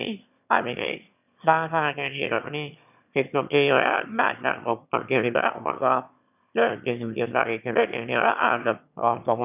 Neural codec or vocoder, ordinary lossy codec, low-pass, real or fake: autoencoder, 22.05 kHz, a latent of 192 numbers a frame, VITS, trained on one speaker; none; 3.6 kHz; fake